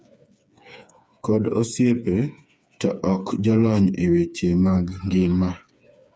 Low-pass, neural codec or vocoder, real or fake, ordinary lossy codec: none; codec, 16 kHz, 4 kbps, FreqCodec, smaller model; fake; none